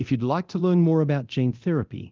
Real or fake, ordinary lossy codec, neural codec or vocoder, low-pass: fake; Opus, 24 kbps; codec, 24 kHz, 0.9 kbps, DualCodec; 7.2 kHz